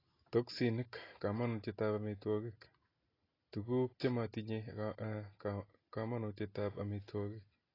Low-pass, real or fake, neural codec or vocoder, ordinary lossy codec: 5.4 kHz; real; none; AAC, 24 kbps